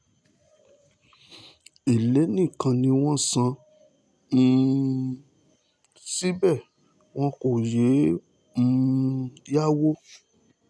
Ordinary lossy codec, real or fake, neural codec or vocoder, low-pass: none; real; none; none